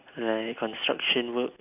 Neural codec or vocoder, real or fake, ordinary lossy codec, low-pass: none; real; none; 3.6 kHz